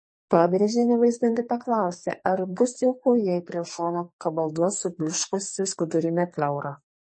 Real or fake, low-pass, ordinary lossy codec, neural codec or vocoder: fake; 9.9 kHz; MP3, 32 kbps; codec, 44.1 kHz, 2.6 kbps, SNAC